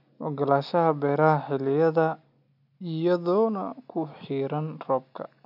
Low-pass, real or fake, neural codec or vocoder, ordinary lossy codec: 5.4 kHz; real; none; none